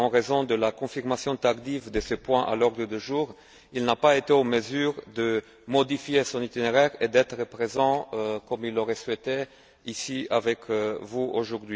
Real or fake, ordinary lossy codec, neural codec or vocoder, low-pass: real; none; none; none